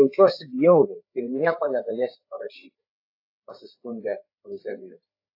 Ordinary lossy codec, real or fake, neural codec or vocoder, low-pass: AAC, 32 kbps; fake; codec, 16 kHz, 4 kbps, FreqCodec, larger model; 5.4 kHz